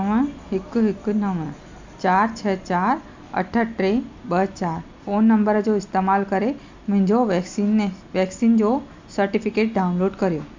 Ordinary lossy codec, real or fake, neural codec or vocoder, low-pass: MP3, 64 kbps; real; none; 7.2 kHz